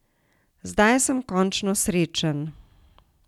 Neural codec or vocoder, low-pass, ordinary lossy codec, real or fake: none; 19.8 kHz; none; real